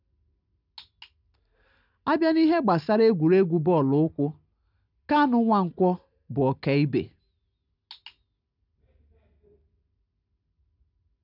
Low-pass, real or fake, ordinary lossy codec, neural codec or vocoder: 5.4 kHz; real; none; none